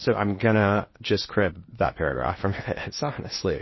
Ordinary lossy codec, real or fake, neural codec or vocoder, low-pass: MP3, 24 kbps; fake; codec, 16 kHz in and 24 kHz out, 0.8 kbps, FocalCodec, streaming, 65536 codes; 7.2 kHz